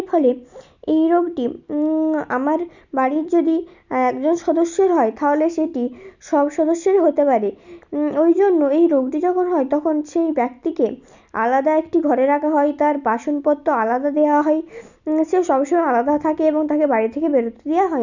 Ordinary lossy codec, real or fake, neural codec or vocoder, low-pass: none; real; none; 7.2 kHz